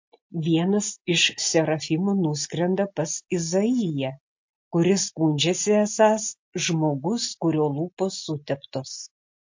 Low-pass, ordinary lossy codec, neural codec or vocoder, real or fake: 7.2 kHz; MP3, 48 kbps; none; real